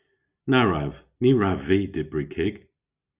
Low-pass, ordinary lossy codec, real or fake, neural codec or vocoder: 3.6 kHz; Opus, 64 kbps; real; none